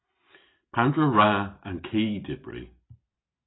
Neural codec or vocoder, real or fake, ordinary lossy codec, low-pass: none; real; AAC, 16 kbps; 7.2 kHz